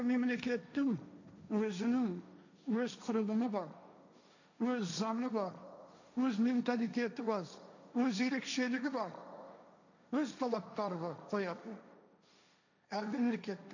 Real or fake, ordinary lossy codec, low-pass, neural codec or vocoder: fake; none; 7.2 kHz; codec, 16 kHz, 1.1 kbps, Voila-Tokenizer